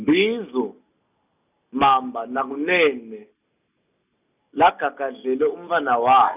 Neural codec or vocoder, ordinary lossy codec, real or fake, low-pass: none; none; real; 3.6 kHz